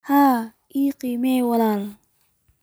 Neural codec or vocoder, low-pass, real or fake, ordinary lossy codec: none; none; real; none